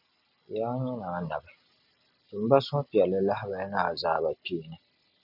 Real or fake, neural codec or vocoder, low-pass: real; none; 5.4 kHz